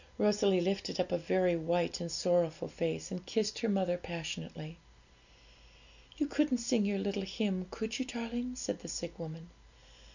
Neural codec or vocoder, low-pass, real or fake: none; 7.2 kHz; real